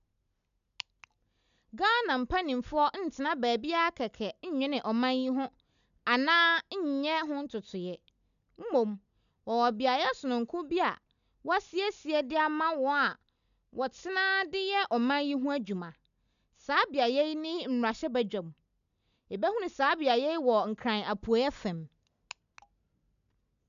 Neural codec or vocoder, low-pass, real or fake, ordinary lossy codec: none; 7.2 kHz; real; none